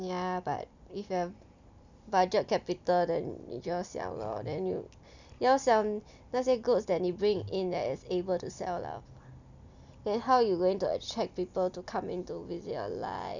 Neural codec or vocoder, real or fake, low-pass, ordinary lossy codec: none; real; 7.2 kHz; none